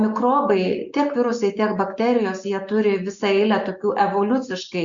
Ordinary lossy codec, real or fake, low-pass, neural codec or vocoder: Opus, 64 kbps; real; 7.2 kHz; none